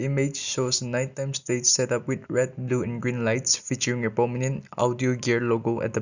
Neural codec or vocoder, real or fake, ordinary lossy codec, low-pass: none; real; none; 7.2 kHz